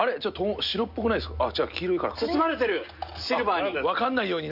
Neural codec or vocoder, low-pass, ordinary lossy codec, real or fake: none; 5.4 kHz; none; real